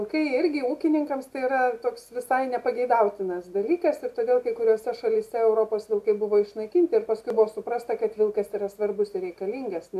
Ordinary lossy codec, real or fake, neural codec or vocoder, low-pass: AAC, 96 kbps; real; none; 14.4 kHz